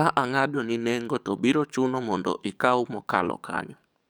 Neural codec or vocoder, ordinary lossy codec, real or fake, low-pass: codec, 44.1 kHz, 7.8 kbps, DAC; none; fake; none